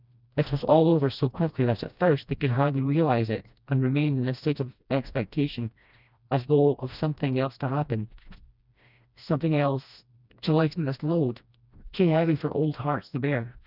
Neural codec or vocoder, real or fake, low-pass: codec, 16 kHz, 1 kbps, FreqCodec, smaller model; fake; 5.4 kHz